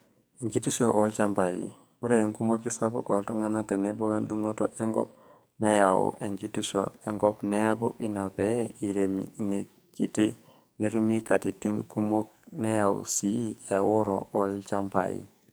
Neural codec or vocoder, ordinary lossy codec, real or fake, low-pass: codec, 44.1 kHz, 2.6 kbps, SNAC; none; fake; none